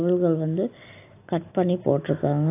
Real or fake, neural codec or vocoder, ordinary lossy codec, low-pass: real; none; AAC, 24 kbps; 3.6 kHz